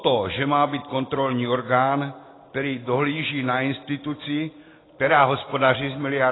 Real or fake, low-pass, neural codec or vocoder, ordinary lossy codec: real; 7.2 kHz; none; AAC, 16 kbps